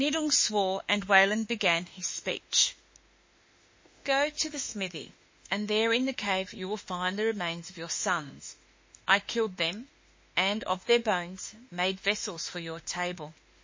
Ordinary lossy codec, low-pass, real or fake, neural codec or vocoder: MP3, 32 kbps; 7.2 kHz; fake; autoencoder, 48 kHz, 32 numbers a frame, DAC-VAE, trained on Japanese speech